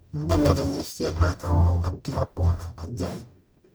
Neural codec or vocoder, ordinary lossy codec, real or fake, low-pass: codec, 44.1 kHz, 0.9 kbps, DAC; none; fake; none